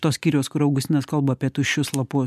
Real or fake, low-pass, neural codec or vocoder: real; 14.4 kHz; none